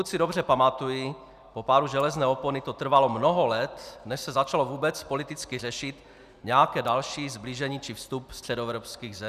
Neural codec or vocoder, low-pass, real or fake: none; 14.4 kHz; real